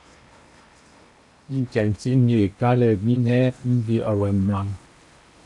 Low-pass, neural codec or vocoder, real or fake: 10.8 kHz; codec, 16 kHz in and 24 kHz out, 0.8 kbps, FocalCodec, streaming, 65536 codes; fake